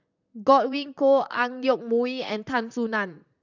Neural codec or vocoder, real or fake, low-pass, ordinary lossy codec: vocoder, 44.1 kHz, 128 mel bands, Pupu-Vocoder; fake; 7.2 kHz; none